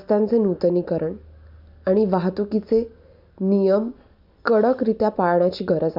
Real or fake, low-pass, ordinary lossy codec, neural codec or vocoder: real; 5.4 kHz; none; none